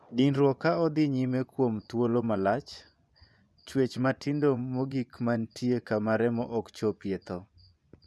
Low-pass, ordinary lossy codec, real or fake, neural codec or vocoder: none; none; fake; vocoder, 24 kHz, 100 mel bands, Vocos